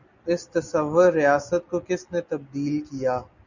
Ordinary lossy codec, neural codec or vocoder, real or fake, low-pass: Opus, 64 kbps; none; real; 7.2 kHz